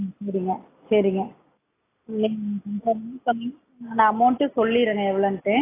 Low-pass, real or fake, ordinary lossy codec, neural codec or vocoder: 3.6 kHz; real; AAC, 16 kbps; none